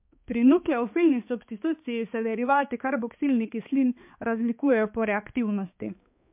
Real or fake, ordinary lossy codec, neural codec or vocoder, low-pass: fake; MP3, 32 kbps; codec, 16 kHz, 2 kbps, X-Codec, HuBERT features, trained on balanced general audio; 3.6 kHz